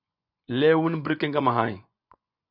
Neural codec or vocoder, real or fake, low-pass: vocoder, 24 kHz, 100 mel bands, Vocos; fake; 5.4 kHz